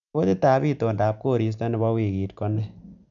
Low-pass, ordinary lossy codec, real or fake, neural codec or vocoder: 7.2 kHz; none; real; none